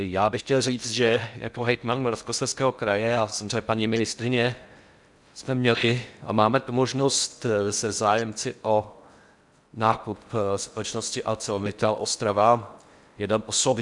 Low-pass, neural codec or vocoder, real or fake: 10.8 kHz; codec, 16 kHz in and 24 kHz out, 0.6 kbps, FocalCodec, streaming, 4096 codes; fake